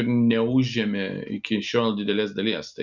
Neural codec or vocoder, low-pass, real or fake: none; 7.2 kHz; real